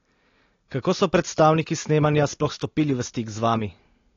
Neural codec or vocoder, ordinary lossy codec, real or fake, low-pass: none; AAC, 32 kbps; real; 7.2 kHz